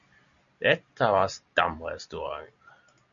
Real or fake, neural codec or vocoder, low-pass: real; none; 7.2 kHz